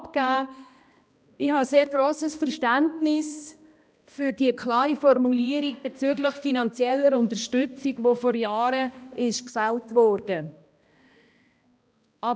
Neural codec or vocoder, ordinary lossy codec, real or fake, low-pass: codec, 16 kHz, 1 kbps, X-Codec, HuBERT features, trained on balanced general audio; none; fake; none